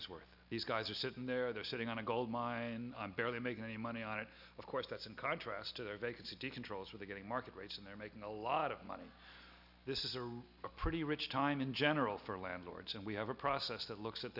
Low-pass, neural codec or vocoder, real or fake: 5.4 kHz; none; real